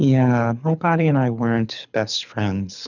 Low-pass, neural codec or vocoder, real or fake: 7.2 kHz; codec, 24 kHz, 6 kbps, HILCodec; fake